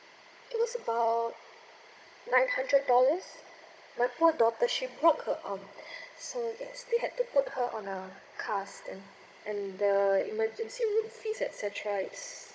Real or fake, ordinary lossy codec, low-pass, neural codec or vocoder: fake; none; none; codec, 16 kHz, 16 kbps, FunCodec, trained on Chinese and English, 50 frames a second